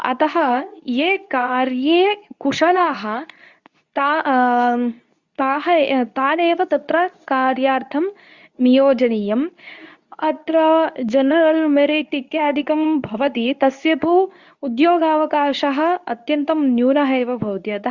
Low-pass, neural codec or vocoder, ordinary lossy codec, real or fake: 7.2 kHz; codec, 24 kHz, 0.9 kbps, WavTokenizer, medium speech release version 2; Opus, 64 kbps; fake